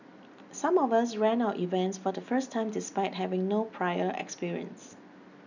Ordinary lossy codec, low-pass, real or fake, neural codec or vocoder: none; 7.2 kHz; real; none